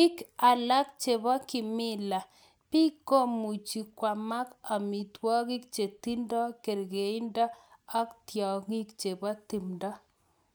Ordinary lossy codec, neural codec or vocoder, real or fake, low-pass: none; none; real; none